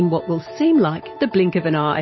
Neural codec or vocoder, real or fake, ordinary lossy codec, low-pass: none; real; MP3, 24 kbps; 7.2 kHz